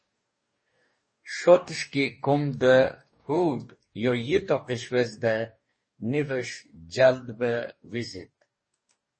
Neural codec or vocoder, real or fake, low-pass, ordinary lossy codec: codec, 44.1 kHz, 2.6 kbps, DAC; fake; 9.9 kHz; MP3, 32 kbps